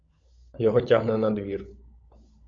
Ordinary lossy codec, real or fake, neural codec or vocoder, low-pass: MP3, 48 kbps; fake; codec, 16 kHz, 16 kbps, FunCodec, trained on LibriTTS, 50 frames a second; 7.2 kHz